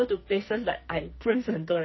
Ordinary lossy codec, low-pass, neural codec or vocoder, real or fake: MP3, 24 kbps; 7.2 kHz; codec, 44.1 kHz, 2.6 kbps, SNAC; fake